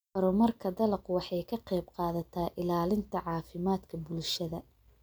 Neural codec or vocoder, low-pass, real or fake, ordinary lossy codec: none; none; real; none